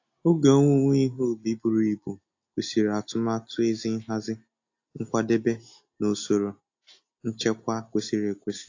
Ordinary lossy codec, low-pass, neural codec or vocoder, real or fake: AAC, 48 kbps; 7.2 kHz; none; real